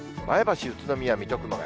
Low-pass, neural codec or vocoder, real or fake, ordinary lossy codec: none; none; real; none